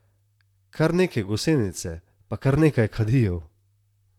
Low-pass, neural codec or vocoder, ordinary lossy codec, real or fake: 19.8 kHz; vocoder, 44.1 kHz, 128 mel bands, Pupu-Vocoder; none; fake